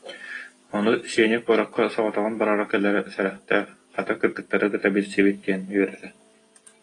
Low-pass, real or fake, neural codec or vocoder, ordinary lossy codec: 10.8 kHz; real; none; AAC, 32 kbps